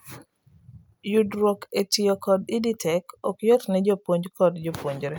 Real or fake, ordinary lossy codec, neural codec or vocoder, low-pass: real; none; none; none